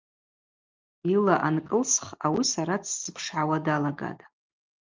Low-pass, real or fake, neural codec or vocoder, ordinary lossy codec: 7.2 kHz; real; none; Opus, 32 kbps